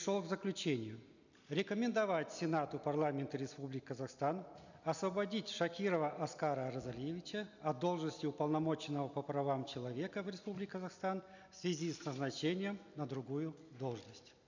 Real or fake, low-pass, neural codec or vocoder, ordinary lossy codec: real; 7.2 kHz; none; none